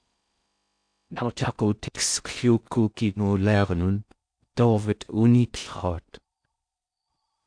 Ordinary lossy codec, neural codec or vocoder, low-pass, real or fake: AAC, 64 kbps; codec, 16 kHz in and 24 kHz out, 0.6 kbps, FocalCodec, streaming, 4096 codes; 9.9 kHz; fake